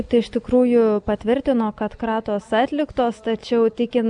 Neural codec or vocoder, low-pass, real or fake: none; 9.9 kHz; real